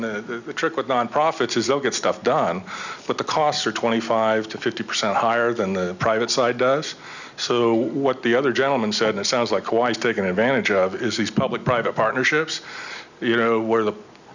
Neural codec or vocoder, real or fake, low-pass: none; real; 7.2 kHz